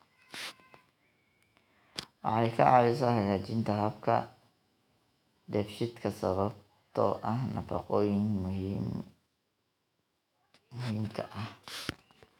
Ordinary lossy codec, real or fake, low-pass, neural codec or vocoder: none; fake; 19.8 kHz; autoencoder, 48 kHz, 128 numbers a frame, DAC-VAE, trained on Japanese speech